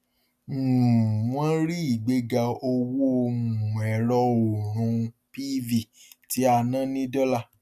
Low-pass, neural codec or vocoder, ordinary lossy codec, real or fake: 14.4 kHz; none; none; real